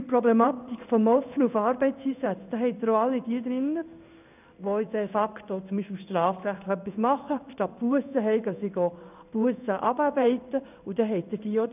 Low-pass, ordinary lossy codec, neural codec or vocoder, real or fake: 3.6 kHz; none; codec, 16 kHz in and 24 kHz out, 1 kbps, XY-Tokenizer; fake